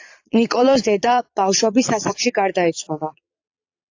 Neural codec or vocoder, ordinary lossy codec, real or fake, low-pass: vocoder, 22.05 kHz, 80 mel bands, Vocos; AAC, 48 kbps; fake; 7.2 kHz